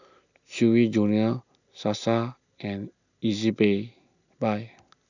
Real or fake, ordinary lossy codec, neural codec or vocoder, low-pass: real; none; none; 7.2 kHz